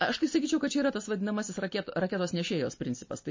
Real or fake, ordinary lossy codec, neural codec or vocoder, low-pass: real; MP3, 32 kbps; none; 7.2 kHz